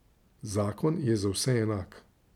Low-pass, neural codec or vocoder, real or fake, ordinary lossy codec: 19.8 kHz; none; real; none